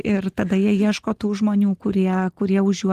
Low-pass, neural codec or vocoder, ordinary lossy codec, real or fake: 14.4 kHz; none; Opus, 16 kbps; real